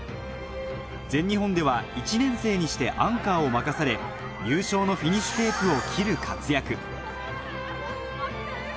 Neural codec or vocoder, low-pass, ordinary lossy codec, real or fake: none; none; none; real